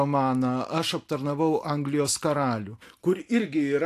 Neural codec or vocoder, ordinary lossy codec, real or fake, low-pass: none; AAC, 64 kbps; real; 14.4 kHz